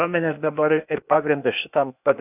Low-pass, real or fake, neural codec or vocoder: 3.6 kHz; fake; codec, 16 kHz, 0.8 kbps, ZipCodec